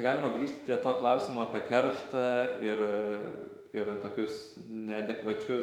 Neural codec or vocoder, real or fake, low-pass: autoencoder, 48 kHz, 32 numbers a frame, DAC-VAE, trained on Japanese speech; fake; 19.8 kHz